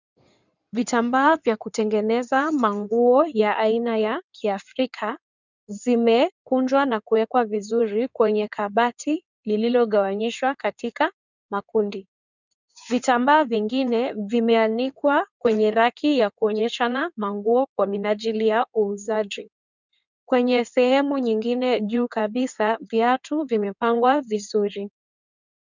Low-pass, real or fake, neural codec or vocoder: 7.2 kHz; fake; codec, 16 kHz in and 24 kHz out, 2.2 kbps, FireRedTTS-2 codec